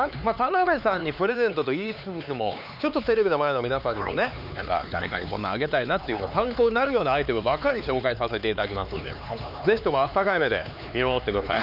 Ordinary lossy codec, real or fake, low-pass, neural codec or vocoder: none; fake; 5.4 kHz; codec, 16 kHz, 4 kbps, X-Codec, HuBERT features, trained on LibriSpeech